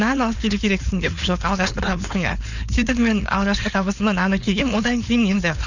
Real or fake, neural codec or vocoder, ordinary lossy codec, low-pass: fake; codec, 16 kHz, 4.8 kbps, FACodec; none; 7.2 kHz